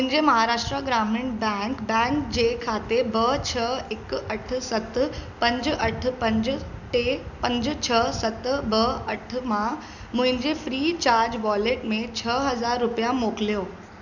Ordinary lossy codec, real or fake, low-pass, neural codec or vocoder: none; real; 7.2 kHz; none